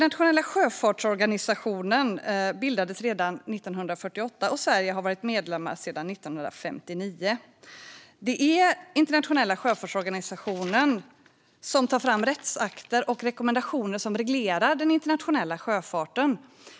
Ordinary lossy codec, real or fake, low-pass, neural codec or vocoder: none; real; none; none